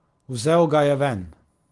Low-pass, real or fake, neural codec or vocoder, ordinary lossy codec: 10.8 kHz; real; none; Opus, 24 kbps